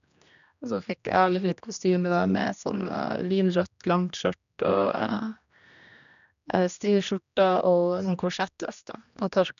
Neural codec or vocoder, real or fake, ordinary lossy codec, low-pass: codec, 16 kHz, 1 kbps, X-Codec, HuBERT features, trained on general audio; fake; none; 7.2 kHz